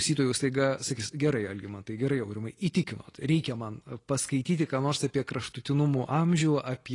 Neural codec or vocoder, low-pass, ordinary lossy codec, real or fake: none; 10.8 kHz; AAC, 32 kbps; real